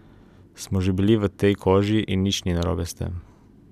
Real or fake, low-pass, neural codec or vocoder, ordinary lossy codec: real; 14.4 kHz; none; none